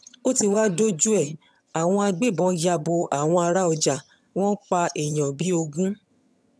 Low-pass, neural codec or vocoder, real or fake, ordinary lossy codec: none; vocoder, 22.05 kHz, 80 mel bands, HiFi-GAN; fake; none